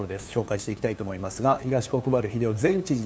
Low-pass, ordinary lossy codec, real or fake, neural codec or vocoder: none; none; fake; codec, 16 kHz, 2 kbps, FunCodec, trained on LibriTTS, 25 frames a second